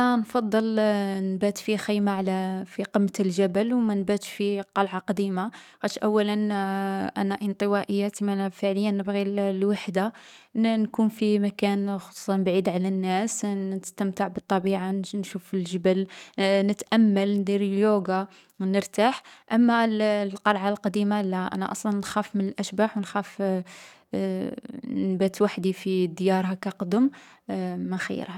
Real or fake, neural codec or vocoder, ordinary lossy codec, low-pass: fake; codec, 44.1 kHz, 7.8 kbps, DAC; none; 19.8 kHz